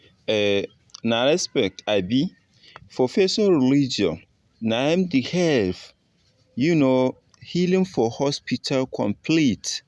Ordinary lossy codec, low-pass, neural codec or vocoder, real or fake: none; none; none; real